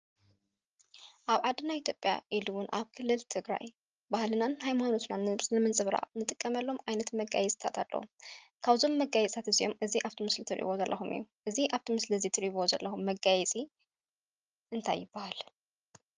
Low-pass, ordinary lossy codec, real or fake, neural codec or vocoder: 7.2 kHz; Opus, 24 kbps; real; none